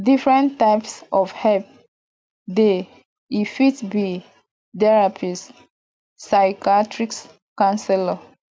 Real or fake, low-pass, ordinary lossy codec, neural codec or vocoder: real; none; none; none